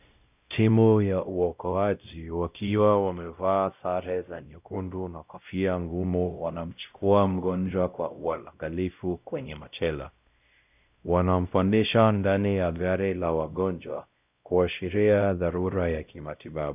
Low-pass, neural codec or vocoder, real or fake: 3.6 kHz; codec, 16 kHz, 0.5 kbps, X-Codec, WavLM features, trained on Multilingual LibriSpeech; fake